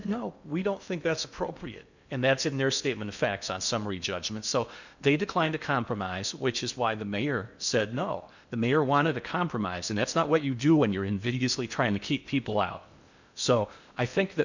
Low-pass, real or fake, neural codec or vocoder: 7.2 kHz; fake; codec, 16 kHz in and 24 kHz out, 0.8 kbps, FocalCodec, streaming, 65536 codes